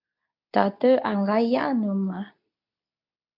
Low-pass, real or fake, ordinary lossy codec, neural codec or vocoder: 5.4 kHz; fake; MP3, 48 kbps; codec, 24 kHz, 0.9 kbps, WavTokenizer, medium speech release version 2